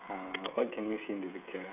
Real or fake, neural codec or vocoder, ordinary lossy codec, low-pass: fake; codec, 16 kHz, 16 kbps, FreqCodec, smaller model; none; 3.6 kHz